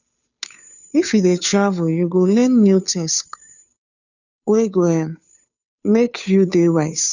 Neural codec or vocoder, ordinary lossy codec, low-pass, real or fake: codec, 16 kHz, 2 kbps, FunCodec, trained on Chinese and English, 25 frames a second; none; 7.2 kHz; fake